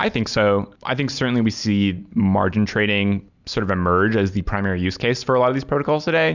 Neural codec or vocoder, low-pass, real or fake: none; 7.2 kHz; real